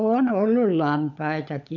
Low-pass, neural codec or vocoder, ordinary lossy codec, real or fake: 7.2 kHz; codec, 16 kHz, 16 kbps, FunCodec, trained on LibriTTS, 50 frames a second; none; fake